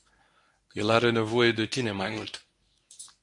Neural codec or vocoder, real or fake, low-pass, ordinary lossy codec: codec, 24 kHz, 0.9 kbps, WavTokenizer, medium speech release version 1; fake; 10.8 kHz; AAC, 64 kbps